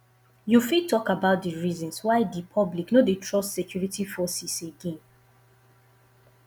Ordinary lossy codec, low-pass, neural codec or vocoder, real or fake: none; none; none; real